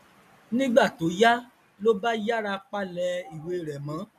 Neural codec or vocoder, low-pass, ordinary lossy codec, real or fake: none; 14.4 kHz; none; real